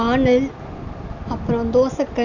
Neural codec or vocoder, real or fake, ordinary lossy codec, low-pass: none; real; none; 7.2 kHz